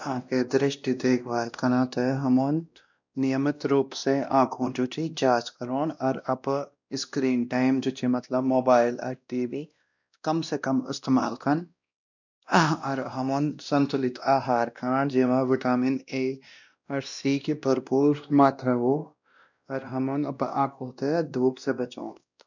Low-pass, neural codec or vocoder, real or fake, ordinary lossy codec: 7.2 kHz; codec, 16 kHz, 1 kbps, X-Codec, WavLM features, trained on Multilingual LibriSpeech; fake; none